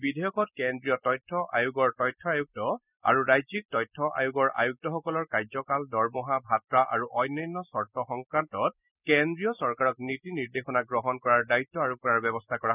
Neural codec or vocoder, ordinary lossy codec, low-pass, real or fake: none; none; 3.6 kHz; real